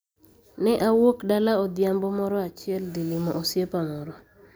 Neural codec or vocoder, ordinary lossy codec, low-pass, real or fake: none; none; none; real